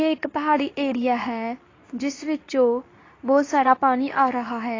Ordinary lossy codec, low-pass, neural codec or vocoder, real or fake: AAC, 32 kbps; 7.2 kHz; codec, 24 kHz, 0.9 kbps, WavTokenizer, medium speech release version 2; fake